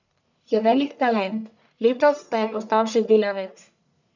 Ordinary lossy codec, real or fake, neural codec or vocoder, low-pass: none; fake; codec, 44.1 kHz, 1.7 kbps, Pupu-Codec; 7.2 kHz